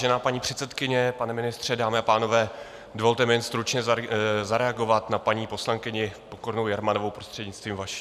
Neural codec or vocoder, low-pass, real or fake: none; 14.4 kHz; real